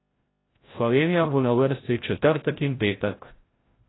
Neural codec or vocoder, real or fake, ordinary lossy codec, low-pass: codec, 16 kHz, 0.5 kbps, FreqCodec, larger model; fake; AAC, 16 kbps; 7.2 kHz